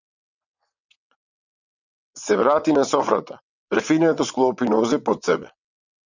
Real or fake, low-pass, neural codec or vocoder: fake; 7.2 kHz; vocoder, 22.05 kHz, 80 mel bands, WaveNeXt